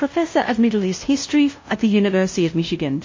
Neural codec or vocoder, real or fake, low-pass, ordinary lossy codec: codec, 16 kHz, 0.5 kbps, FunCodec, trained on LibriTTS, 25 frames a second; fake; 7.2 kHz; MP3, 32 kbps